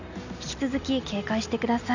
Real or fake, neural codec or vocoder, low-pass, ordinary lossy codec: real; none; 7.2 kHz; none